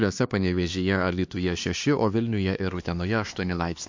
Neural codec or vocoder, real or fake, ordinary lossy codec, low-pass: codec, 16 kHz, 2 kbps, X-Codec, HuBERT features, trained on LibriSpeech; fake; MP3, 48 kbps; 7.2 kHz